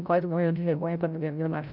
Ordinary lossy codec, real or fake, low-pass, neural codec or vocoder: none; fake; 5.4 kHz; codec, 16 kHz, 0.5 kbps, FreqCodec, larger model